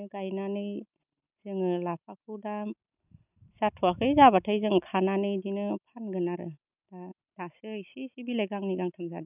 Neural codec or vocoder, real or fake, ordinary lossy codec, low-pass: none; real; none; 3.6 kHz